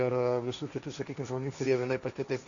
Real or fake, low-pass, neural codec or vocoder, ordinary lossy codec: fake; 7.2 kHz; codec, 16 kHz, 1.1 kbps, Voila-Tokenizer; MP3, 96 kbps